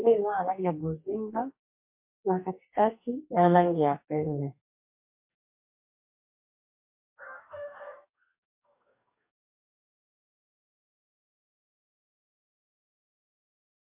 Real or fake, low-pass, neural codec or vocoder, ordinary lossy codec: fake; 3.6 kHz; codec, 44.1 kHz, 2.6 kbps, DAC; MP3, 32 kbps